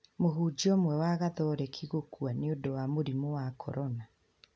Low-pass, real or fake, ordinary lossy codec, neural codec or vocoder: none; real; none; none